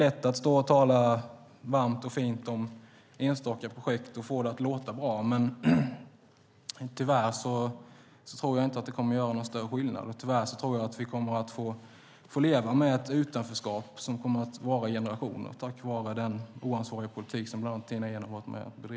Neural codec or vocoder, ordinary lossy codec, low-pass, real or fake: none; none; none; real